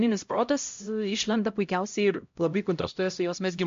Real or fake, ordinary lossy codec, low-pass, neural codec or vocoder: fake; MP3, 48 kbps; 7.2 kHz; codec, 16 kHz, 0.5 kbps, X-Codec, HuBERT features, trained on LibriSpeech